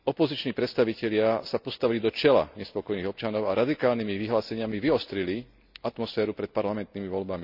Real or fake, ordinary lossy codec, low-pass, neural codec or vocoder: real; none; 5.4 kHz; none